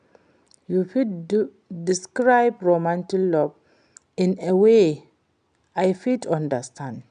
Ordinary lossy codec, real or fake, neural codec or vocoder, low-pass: none; real; none; 9.9 kHz